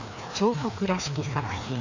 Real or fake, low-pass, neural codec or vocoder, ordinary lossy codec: fake; 7.2 kHz; codec, 16 kHz, 2 kbps, FreqCodec, larger model; AAC, 48 kbps